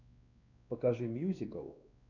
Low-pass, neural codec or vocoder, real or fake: 7.2 kHz; codec, 16 kHz, 2 kbps, X-Codec, WavLM features, trained on Multilingual LibriSpeech; fake